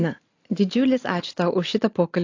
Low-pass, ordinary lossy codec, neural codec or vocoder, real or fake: 7.2 kHz; AAC, 48 kbps; none; real